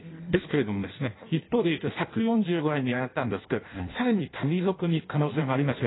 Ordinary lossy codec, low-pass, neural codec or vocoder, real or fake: AAC, 16 kbps; 7.2 kHz; codec, 16 kHz in and 24 kHz out, 0.6 kbps, FireRedTTS-2 codec; fake